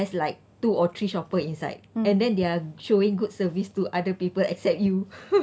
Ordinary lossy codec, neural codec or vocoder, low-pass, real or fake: none; none; none; real